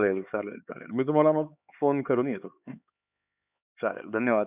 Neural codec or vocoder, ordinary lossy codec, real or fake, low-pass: codec, 16 kHz, 4 kbps, X-Codec, HuBERT features, trained on LibriSpeech; none; fake; 3.6 kHz